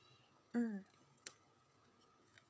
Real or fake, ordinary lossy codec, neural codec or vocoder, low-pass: fake; none; codec, 16 kHz, 16 kbps, FreqCodec, smaller model; none